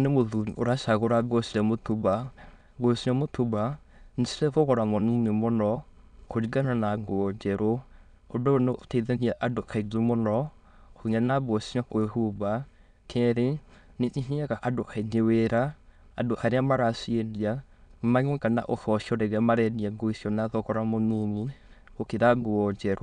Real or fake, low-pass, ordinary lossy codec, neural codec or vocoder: fake; 9.9 kHz; none; autoencoder, 22.05 kHz, a latent of 192 numbers a frame, VITS, trained on many speakers